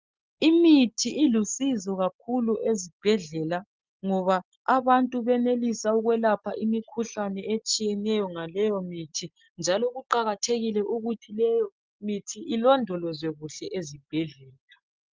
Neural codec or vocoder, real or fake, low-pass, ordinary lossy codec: none; real; 7.2 kHz; Opus, 32 kbps